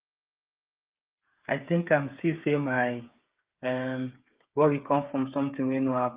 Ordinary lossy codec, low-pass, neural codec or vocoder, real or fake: Opus, 32 kbps; 3.6 kHz; codec, 16 kHz, 8 kbps, FreqCodec, smaller model; fake